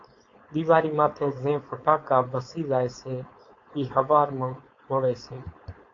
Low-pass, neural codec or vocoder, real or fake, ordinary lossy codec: 7.2 kHz; codec, 16 kHz, 4.8 kbps, FACodec; fake; AAC, 48 kbps